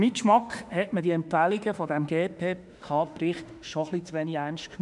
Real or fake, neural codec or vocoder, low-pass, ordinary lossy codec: fake; autoencoder, 48 kHz, 32 numbers a frame, DAC-VAE, trained on Japanese speech; 10.8 kHz; none